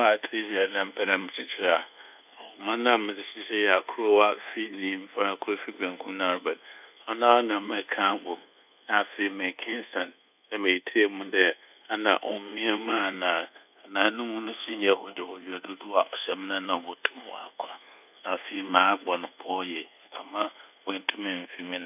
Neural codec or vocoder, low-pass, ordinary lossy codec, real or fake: codec, 24 kHz, 1.2 kbps, DualCodec; 3.6 kHz; none; fake